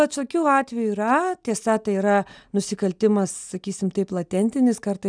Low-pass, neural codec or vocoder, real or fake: 9.9 kHz; none; real